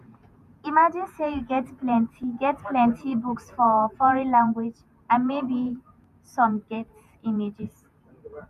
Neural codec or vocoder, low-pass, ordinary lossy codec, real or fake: vocoder, 48 kHz, 128 mel bands, Vocos; 14.4 kHz; none; fake